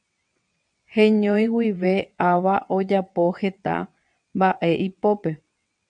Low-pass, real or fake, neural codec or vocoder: 9.9 kHz; fake; vocoder, 22.05 kHz, 80 mel bands, WaveNeXt